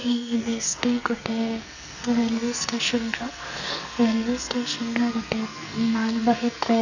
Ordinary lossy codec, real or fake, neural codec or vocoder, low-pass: none; fake; codec, 44.1 kHz, 2.6 kbps, SNAC; 7.2 kHz